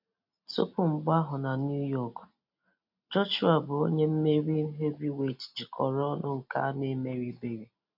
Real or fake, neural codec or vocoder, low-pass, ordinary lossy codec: real; none; 5.4 kHz; AAC, 48 kbps